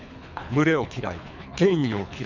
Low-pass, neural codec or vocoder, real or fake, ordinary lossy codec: 7.2 kHz; codec, 24 kHz, 3 kbps, HILCodec; fake; none